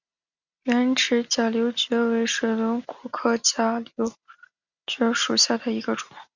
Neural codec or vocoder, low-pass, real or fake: none; 7.2 kHz; real